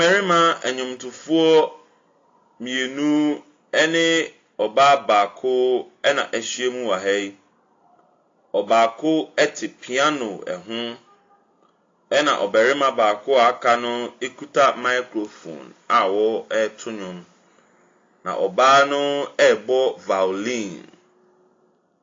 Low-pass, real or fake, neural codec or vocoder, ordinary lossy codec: 7.2 kHz; real; none; AAC, 32 kbps